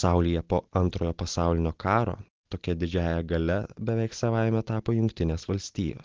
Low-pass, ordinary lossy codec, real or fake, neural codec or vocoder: 7.2 kHz; Opus, 16 kbps; real; none